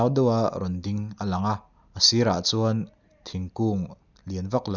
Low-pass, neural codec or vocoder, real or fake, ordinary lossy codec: 7.2 kHz; none; real; none